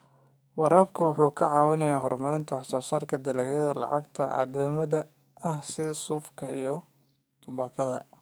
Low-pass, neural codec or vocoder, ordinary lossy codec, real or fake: none; codec, 44.1 kHz, 2.6 kbps, SNAC; none; fake